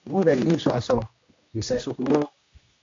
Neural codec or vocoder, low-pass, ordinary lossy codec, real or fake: codec, 16 kHz, 1 kbps, X-Codec, HuBERT features, trained on general audio; 7.2 kHz; AAC, 48 kbps; fake